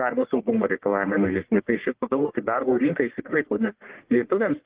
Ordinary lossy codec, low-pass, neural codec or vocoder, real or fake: Opus, 16 kbps; 3.6 kHz; codec, 44.1 kHz, 1.7 kbps, Pupu-Codec; fake